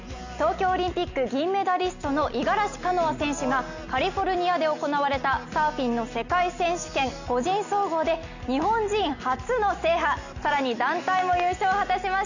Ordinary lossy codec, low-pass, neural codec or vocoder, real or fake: none; 7.2 kHz; none; real